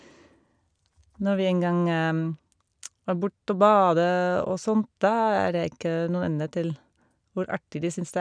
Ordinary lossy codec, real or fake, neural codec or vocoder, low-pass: none; real; none; 9.9 kHz